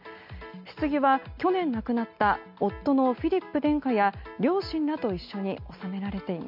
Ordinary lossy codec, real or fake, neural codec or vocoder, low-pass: MP3, 48 kbps; real; none; 5.4 kHz